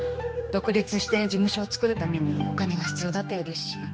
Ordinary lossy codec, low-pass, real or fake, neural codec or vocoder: none; none; fake; codec, 16 kHz, 2 kbps, X-Codec, HuBERT features, trained on general audio